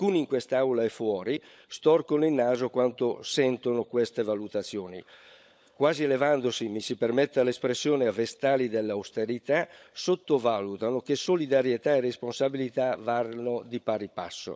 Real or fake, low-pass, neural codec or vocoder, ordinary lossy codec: fake; none; codec, 16 kHz, 16 kbps, FunCodec, trained on LibriTTS, 50 frames a second; none